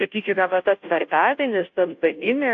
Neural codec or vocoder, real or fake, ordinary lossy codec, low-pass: codec, 16 kHz, 0.5 kbps, FunCodec, trained on Chinese and English, 25 frames a second; fake; AAC, 48 kbps; 7.2 kHz